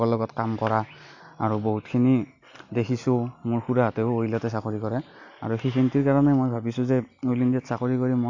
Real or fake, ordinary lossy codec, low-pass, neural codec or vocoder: real; AAC, 48 kbps; 7.2 kHz; none